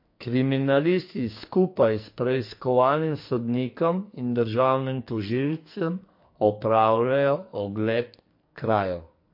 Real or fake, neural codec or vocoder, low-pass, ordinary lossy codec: fake; codec, 44.1 kHz, 2.6 kbps, SNAC; 5.4 kHz; MP3, 32 kbps